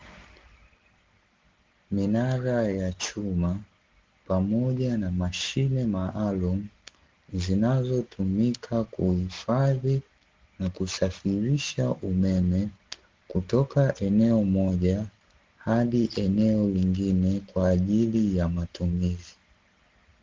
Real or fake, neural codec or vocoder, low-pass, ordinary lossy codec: real; none; 7.2 kHz; Opus, 16 kbps